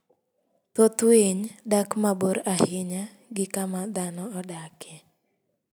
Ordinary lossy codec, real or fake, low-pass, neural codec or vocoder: none; real; none; none